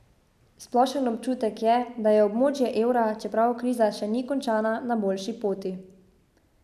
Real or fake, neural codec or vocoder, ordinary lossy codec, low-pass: real; none; none; 14.4 kHz